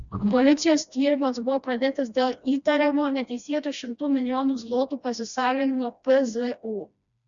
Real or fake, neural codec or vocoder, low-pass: fake; codec, 16 kHz, 1 kbps, FreqCodec, smaller model; 7.2 kHz